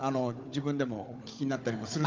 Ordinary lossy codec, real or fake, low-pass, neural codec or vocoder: Opus, 32 kbps; fake; 7.2 kHz; codec, 16 kHz, 16 kbps, FunCodec, trained on Chinese and English, 50 frames a second